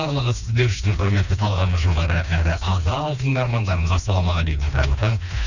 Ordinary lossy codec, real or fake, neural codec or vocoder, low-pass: none; fake; codec, 16 kHz, 2 kbps, FreqCodec, smaller model; 7.2 kHz